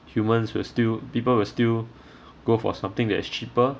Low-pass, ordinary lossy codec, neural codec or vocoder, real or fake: none; none; none; real